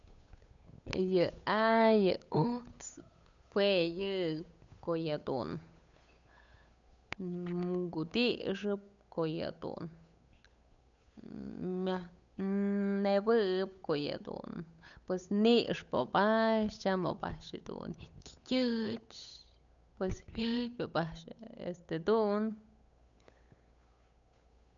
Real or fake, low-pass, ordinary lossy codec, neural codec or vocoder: fake; 7.2 kHz; none; codec, 16 kHz, 8 kbps, FunCodec, trained on Chinese and English, 25 frames a second